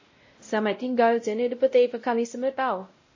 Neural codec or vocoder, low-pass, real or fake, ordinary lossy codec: codec, 16 kHz, 0.5 kbps, X-Codec, WavLM features, trained on Multilingual LibriSpeech; 7.2 kHz; fake; MP3, 32 kbps